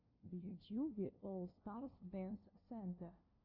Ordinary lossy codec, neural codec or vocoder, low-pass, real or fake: AAC, 48 kbps; codec, 16 kHz, 0.5 kbps, FunCodec, trained on LibriTTS, 25 frames a second; 5.4 kHz; fake